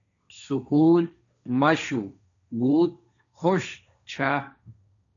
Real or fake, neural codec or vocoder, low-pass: fake; codec, 16 kHz, 1.1 kbps, Voila-Tokenizer; 7.2 kHz